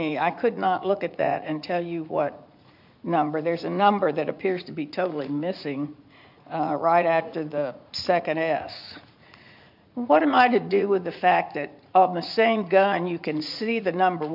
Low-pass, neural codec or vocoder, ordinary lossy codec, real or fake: 5.4 kHz; vocoder, 44.1 kHz, 80 mel bands, Vocos; MP3, 48 kbps; fake